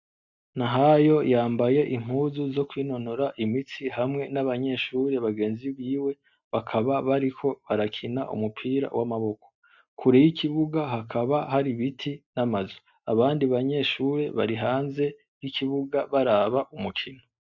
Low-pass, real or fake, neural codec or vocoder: 7.2 kHz; real; none